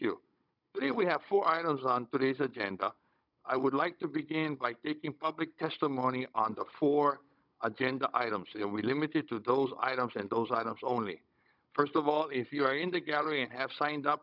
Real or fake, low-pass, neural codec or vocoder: fake; 5.4 kHz; codec, 16 kHz, 16 kbps, FunCodec, trained on Chinese and English, 50 frames a second